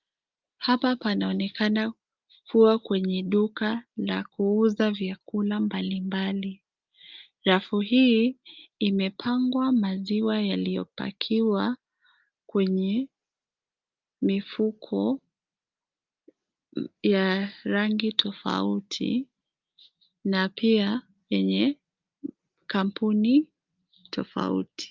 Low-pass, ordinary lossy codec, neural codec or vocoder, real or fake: 7.2 kHz; Opus, 24 kbps; none; real